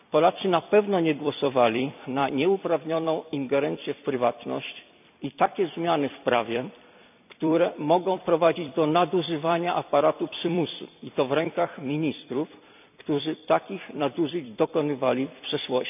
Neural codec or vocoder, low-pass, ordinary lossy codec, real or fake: vocoder, 44.1 kHz, 128 mel bands every 256 samples, BigVGAN v2; 3.6 kHz; none; fake